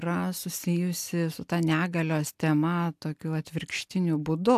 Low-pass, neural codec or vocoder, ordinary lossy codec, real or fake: 14.4 kHz; none; AAC, 96 kbps; real